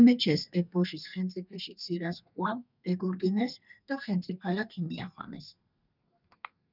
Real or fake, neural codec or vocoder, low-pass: fake; codec, 32 kHz, 1.9 kbps, SNAC; 5.4 kHz